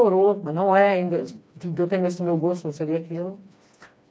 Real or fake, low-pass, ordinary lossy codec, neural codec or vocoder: fake; none; none; codec, 16 kHz, 2 kbps, FreqCodec, smaller model